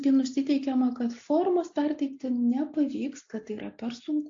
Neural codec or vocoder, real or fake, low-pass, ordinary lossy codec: none; real; 7.2 kHz; AAC, 48 kbps